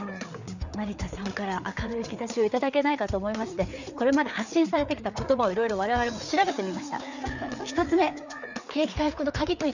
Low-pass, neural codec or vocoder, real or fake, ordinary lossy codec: 7.2 kHz; codec, 16 kHz, 4 kbps, FreqCodec, larger model; fake; none